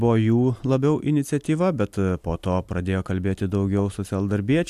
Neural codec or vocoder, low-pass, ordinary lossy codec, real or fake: none; 14.4 kHz; AAC, 96 kbps; real